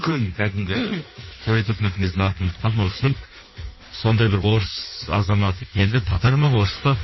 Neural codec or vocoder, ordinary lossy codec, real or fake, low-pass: codec, 16 kHz in and 24 kHz out, 1.1 kbps, FireRedTTS-2 codec; MP3, 24 kbps; fake; 7.2 kHz